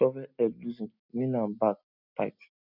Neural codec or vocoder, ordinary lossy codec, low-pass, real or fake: none; none; 5.4 kHz; real